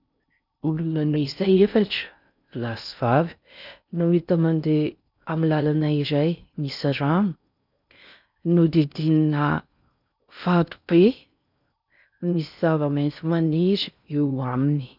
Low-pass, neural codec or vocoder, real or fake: 5.4 kHz; codec, 16 kHz in and 24 kHz out, 0.6 kbps, FocalCodec, streaming, 4096 codes; fake